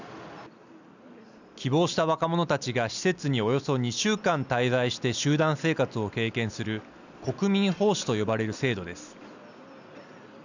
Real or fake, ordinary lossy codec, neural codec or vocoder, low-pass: real; none; none; 7.2 kHz